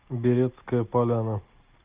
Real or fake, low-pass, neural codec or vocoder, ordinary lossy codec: real; 3.6 kHz; none; Opus, 24 kbps